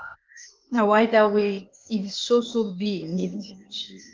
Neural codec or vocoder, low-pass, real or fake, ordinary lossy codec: codec, 16 kHz, 0.8 kbps, ZipCodec; 7.2 kHz; fake; Opus, 32 kbps